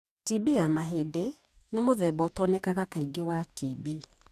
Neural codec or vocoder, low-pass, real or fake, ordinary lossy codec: codec, 44.1 kHz, 2.6 kbps, DAC; 14.4 kHz; fake; none